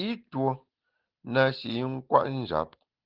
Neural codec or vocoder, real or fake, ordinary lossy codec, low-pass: none; real; Opus, 16 kbps; 5.4 kHz